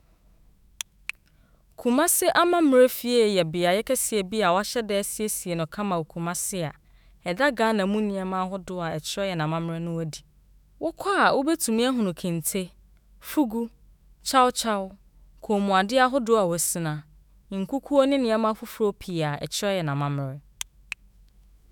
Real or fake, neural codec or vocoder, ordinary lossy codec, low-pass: fake; autoencoder, 48 kHz, 128 numbers a frame, DAC-VAE, trained on Japanese speech; none; none